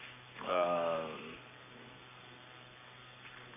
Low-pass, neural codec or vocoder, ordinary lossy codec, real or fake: 3.6 kHz; codec, 44.1 kHz, 7.8 kbps, Pupu-Codec; none; fake